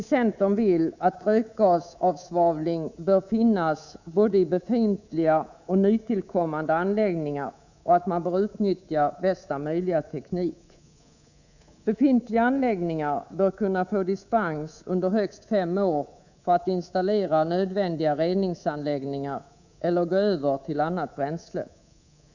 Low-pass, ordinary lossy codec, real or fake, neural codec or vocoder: 7.2 kHz; none; fake; codec, 24 kHz, 3.1 kbps, DualCodec